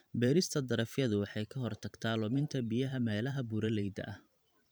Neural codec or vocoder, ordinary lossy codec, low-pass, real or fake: vocoder, 44.1 kHz, 128 mel bands every 512 samples, BigVGAN v2; none; none; fake